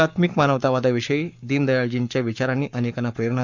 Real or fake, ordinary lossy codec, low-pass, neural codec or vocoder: fake; none; 7.2 kHz; codec, 44.1 kHz, 7.8 kbps, Pupu-Codec